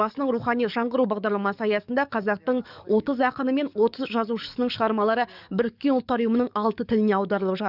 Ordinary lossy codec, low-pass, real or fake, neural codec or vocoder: none; 5.4 kHz; fake; codec, 44.1 kHz, 7.8 kbps, DAC